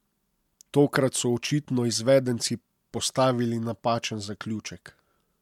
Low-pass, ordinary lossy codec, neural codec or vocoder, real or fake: 19.8 kHz; MP3, 96 kbps; none; real